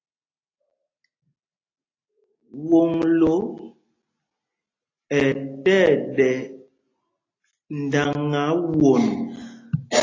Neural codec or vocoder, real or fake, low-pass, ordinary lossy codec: none; real; 7.2 kHz; AAC, 32 kbps